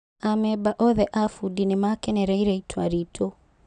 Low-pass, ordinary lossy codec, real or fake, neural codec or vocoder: 9.9 kHz; none; real; none